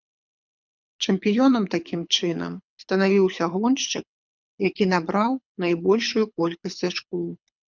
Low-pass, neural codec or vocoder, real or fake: 7.2 kHz; codec, 24 kHz, 6 kbps, HILCodec; fake